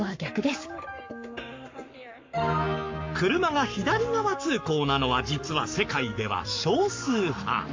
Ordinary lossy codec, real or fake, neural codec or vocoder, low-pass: MP3, 48 kbps; fake; codec, 44.1 kHz, 7.8 kbps, Pupu-Codec; 7.2 kHz